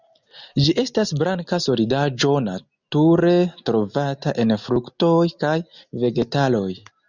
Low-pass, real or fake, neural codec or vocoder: 7.2 kHz; real; none